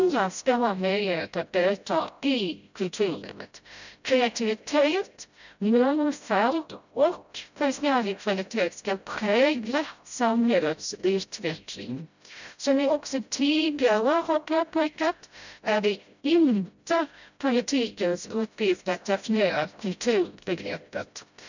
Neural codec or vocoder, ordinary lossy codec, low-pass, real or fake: codec, 16 kHz, 0.5 kbps, FreqCodec, smaller model; none; 7.2 kHz; fake